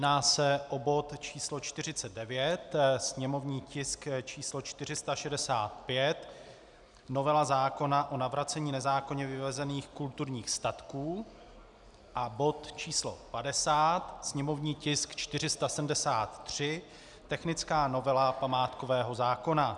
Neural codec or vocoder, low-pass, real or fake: none; 10.8 kHz; real